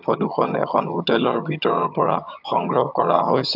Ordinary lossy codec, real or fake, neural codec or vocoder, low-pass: AAC, 48 kbps; fake; vocoder, 22.05 kHz, 80 mel bands, HiFi-GAN; 5.4 kHz